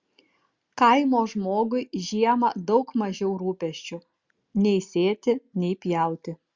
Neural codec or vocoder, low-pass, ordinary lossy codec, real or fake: none; 7.2 kHz; Opus, 64 kbps; real